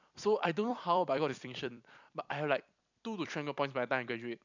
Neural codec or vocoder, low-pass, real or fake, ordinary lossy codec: vocoder, 44.1 kHz, 128 mel bands every 256 samples, BigVGAN v2; 7.2 kHz; fake; none